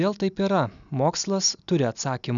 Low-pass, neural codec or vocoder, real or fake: 7.2 kHz; none; real